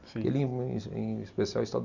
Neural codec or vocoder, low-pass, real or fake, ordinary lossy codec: none; 7.2 kHz; real; none